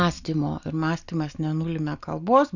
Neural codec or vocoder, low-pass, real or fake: none; 7.2 kHz; real